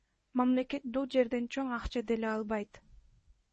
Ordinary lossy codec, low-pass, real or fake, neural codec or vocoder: MP3, 32 kbps; 10.8 kHz; fake; codec, 24 kHz, 0.9 kbps, WavTokenizer, medium speech release version 1